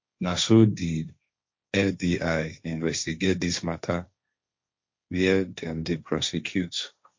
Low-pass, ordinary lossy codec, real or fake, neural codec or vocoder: 7.2 kHz; MP3, 48 kbps; fake; codec, 16 kHz, 1.1 kbps, Voila-Tokenizer